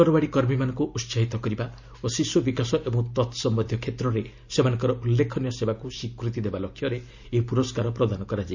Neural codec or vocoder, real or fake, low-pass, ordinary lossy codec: none; real; 7.2 kHz; Opus, 64 kbps